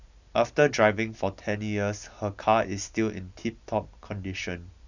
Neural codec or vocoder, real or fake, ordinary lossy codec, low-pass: none; real; none; 7.2 kHz